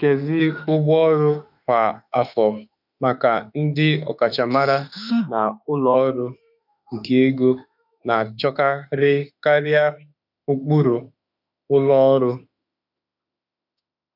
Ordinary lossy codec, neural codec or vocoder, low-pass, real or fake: none; autoencoder, 48 kHz, 32 numbers a frame, DAC-VAE, trained on Japanese speech; 5.4 kHz; fake